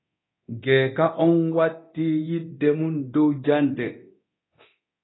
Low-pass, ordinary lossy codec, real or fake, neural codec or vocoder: 7.2 kHz; AAC, 16 kbps; fake; codec, 24 kHz, 0.9 kbps, DualCodec